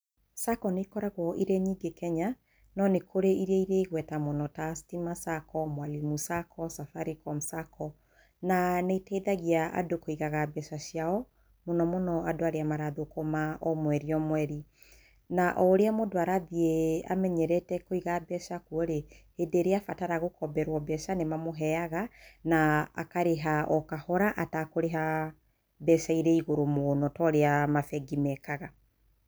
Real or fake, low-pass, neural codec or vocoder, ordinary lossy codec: real; none; none; none